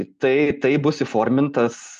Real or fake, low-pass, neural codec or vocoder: real; 10.8 kHz; none